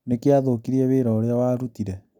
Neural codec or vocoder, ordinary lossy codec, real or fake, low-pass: none; none; real; 19.8 kHz